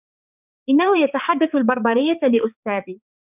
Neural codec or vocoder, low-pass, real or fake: codec, 16 kHz, 4 kbps, X-Codec, HuBERT features, trained on balanced general audio; 3.6 kHz; fake